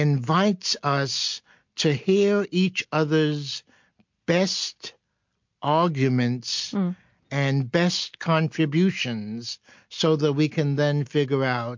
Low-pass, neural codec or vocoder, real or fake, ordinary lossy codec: 7.2 kHz; none; real; MP3, 48 kbps